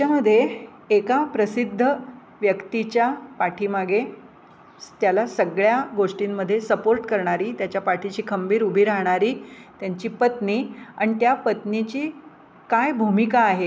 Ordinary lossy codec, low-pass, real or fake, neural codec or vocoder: none; none; real; none